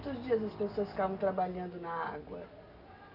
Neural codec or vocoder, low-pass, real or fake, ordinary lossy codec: none; 5.4 kHz; real; none